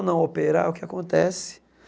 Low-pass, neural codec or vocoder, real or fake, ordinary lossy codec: none; none; real; none